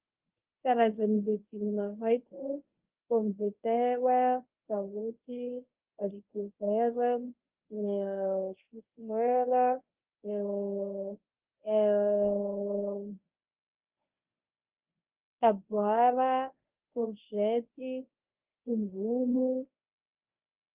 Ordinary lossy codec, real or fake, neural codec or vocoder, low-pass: Opus, 24 kbps; fake; codec, 24 kHz, 0.9 kbps, WavTokenizer, medium speech release version 1; 3.6 kHz